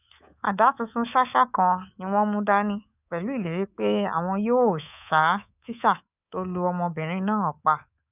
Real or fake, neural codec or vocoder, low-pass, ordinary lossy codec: fake; codec, 24 kHz, 3.1 kbps, DualCodec; 3.6 kHz; none